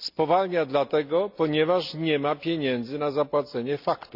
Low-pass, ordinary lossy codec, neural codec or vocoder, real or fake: 5.4 kHz; none; none; real